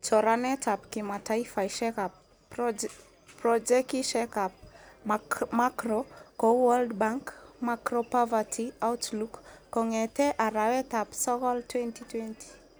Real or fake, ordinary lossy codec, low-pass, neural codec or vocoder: real; none; none; none